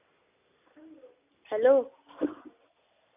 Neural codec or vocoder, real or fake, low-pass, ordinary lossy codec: none; real; 3.6 kHz; none